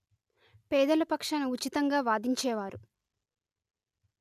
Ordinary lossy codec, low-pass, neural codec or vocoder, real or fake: none; 14.4 kHz; none; real